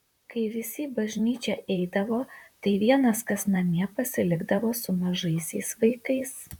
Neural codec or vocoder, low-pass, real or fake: vocoder, 44.1 kHz, 128 mel bands, Pupu-Vocoder; 19.8 kHz; fake